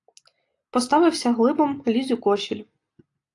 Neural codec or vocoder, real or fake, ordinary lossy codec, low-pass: none; real; AAC, 48 kbps; 10.8 kHz